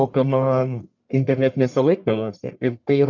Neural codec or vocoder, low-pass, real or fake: codec, 44.1 kHz, 1.7 kbps, Pupu-Codec; 7.2 kHz; fake